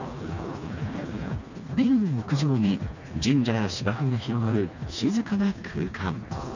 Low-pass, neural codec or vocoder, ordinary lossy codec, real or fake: 7.2 kHz; codec, 16 kHz, 2 kbps, FreqCodec, smaller model; none; fake